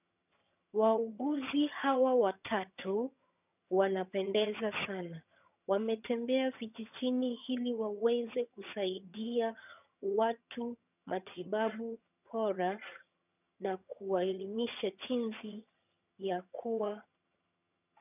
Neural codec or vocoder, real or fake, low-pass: vocoder, 22.05 kHz, 80 mel bands, HiFi-GAN; fake; 3.6 kHz